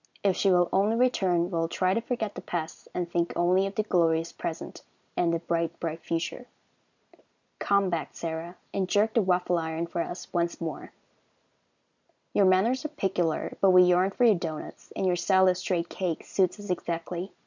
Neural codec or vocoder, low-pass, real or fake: none; 7.2 kHz; real